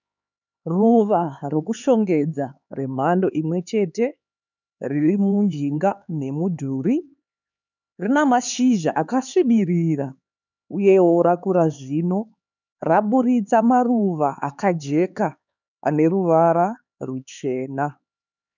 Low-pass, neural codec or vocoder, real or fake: 7.2 kHz; codec, 16 kHz, 4 kbps, X-Codec, HuBERT features, trained on LibriSpeech; fake